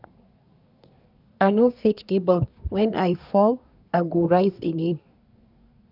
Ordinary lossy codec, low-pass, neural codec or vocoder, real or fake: none; 5.4 kHz; codec, 24 kHz, 1 kbps, SNAC; fake